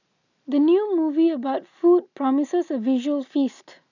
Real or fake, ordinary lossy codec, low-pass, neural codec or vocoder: real; none; 7.2 kHz; none